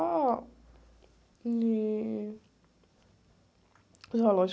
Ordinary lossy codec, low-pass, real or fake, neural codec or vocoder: none; none; real; none